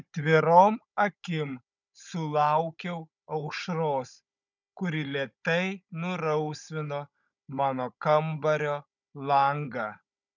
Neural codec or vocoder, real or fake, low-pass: codec, 16 kHz, 16 kbps, FunCodec, trained on Chinese and English, 50 frames a second; fake; 7.2 kHz